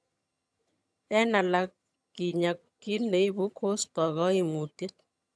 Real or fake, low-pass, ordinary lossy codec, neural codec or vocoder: fake; none; none; vocoder, 22.05 kHz, 80 mel bands, HiFi-GAN